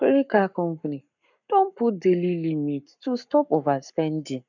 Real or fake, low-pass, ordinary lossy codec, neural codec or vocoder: fake; 7.2 kHz; none; codec, 44.1 kHz, 7.8 kbps, Pupu-Codec